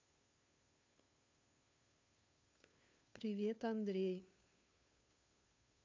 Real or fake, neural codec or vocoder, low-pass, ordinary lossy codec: fake; codec, 16 kHz, 2 kbps, FunCodec, trained on Chinese and English, 25 frames a second; 7.2 kHz; MP3, 48 kbps